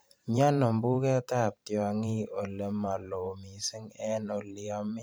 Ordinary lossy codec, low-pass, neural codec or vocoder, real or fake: none; none; vocoder, 44.1 kHz, 128 mel bands every 512 samples, BigVGAN v2; fake